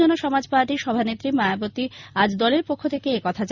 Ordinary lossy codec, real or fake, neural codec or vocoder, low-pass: Opus, 64 kbps; real; none; 7.2 kHz